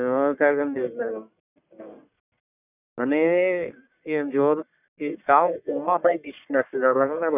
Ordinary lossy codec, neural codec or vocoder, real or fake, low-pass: none; codec, 44.1 kHz, 1.7 kbps, Pupu-Codec; fake; 3.6 kHz